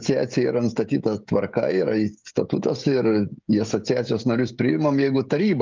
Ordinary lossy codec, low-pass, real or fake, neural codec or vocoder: Opus, 24 kbps; 7.2 kHz; fake; codec, 16 kHz, 16 kbps, FreqCodec, smaller model